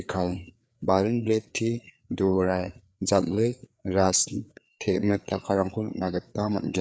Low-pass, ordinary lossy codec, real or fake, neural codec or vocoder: none; none; fake; codec, 16 kHz, 4 kbps, FreqCodec, larger model